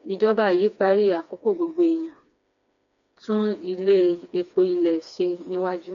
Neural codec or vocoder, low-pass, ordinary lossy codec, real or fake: codec, 16 kHz, 2 kbps, FreqCodec, smaller model; 7.2 kHz; MP3, 64 kbps; fake